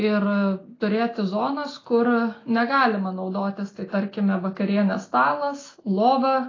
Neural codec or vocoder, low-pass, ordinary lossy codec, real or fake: none; 7.2 kHz; AAC, 32 kbps; real